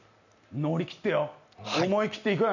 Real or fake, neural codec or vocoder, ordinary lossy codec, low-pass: real; none; none; 7.2 kHz